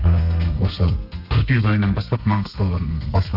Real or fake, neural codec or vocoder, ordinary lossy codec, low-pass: fake; codec, 32 kHz, 1.9 kbps, SNAC; none; 5.4 kHz